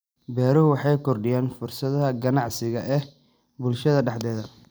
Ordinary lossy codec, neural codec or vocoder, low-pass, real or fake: none; vocoder, 44.1 kHz, 128 mel bands every 256 samples, BigVGAN v2; none; fake